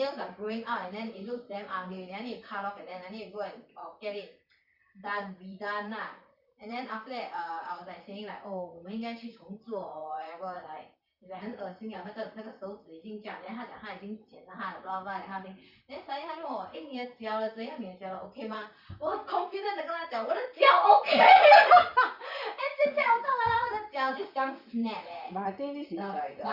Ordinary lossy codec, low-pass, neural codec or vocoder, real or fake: Opus, 64 kbps; 5.4 kHz; vocoder, 44.1 kHz, 128 mel bands, Pupu-Vocoder; fake